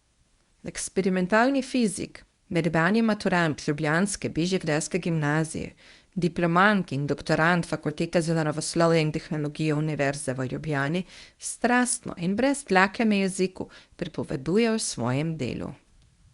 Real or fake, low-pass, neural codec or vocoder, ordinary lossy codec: fake; 10.8 kHz; codec, 24 kHz, 0.9 kbps, WavTokenizer, medium speech release version 1; Opus, 64 kbps